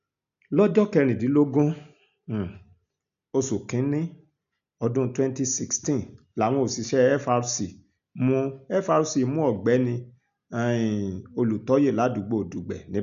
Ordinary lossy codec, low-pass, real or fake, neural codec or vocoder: none; 7.2 kHz; real; none